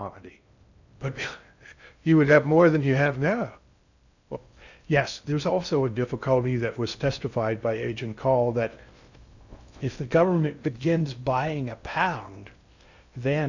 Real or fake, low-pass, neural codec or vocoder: fake; 7.2 kHz; codec, 16 kHz in and 24 kHz out, 0.6 kbps, FocalCodec, streaming, 2048 codes